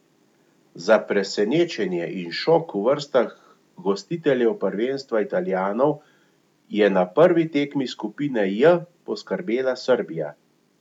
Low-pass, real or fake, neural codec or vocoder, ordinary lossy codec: 19.8 kHz; real; none; none